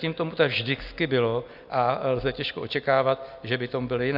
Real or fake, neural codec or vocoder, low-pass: real; none; 5.4 kHz